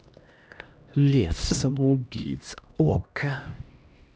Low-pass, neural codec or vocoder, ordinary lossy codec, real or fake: none; codec, 16 kHz, 1 kbps, X-Codec, HuBERT features, trained on LibriSpeech; none; fake